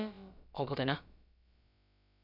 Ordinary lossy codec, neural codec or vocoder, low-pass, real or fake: none; codec, 16 kHz, about 1 kbps, DyCAST, with the encoder's durations; 5.4 kHz; fake